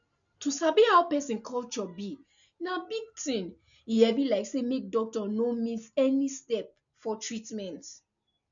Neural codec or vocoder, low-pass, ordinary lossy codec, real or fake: none; 7.2 kHz; MP3, 96 kbps; real